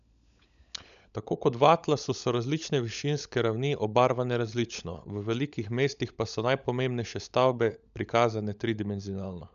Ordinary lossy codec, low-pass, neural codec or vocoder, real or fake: none; 7.2 kHz; codec, 16 kHz, 16 kbps, FunCodec, trained on LibriTTS, 50 frames a second; fake